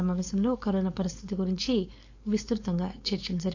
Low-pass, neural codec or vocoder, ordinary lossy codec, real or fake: 7.2 kHz; codec, 16 kHz, 4.8 kbps, FACodec; AAC, 48 kbps; fake